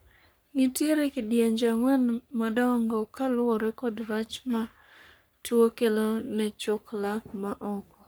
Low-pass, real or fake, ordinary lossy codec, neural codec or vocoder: none; fake; none; codec, 44.1 kHz, 3.4 kbps, Pupu-Codec